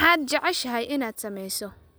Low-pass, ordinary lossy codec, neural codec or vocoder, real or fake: none; none; none; real